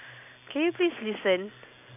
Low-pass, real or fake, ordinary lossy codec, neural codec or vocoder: 3.6 kHz; real; none; none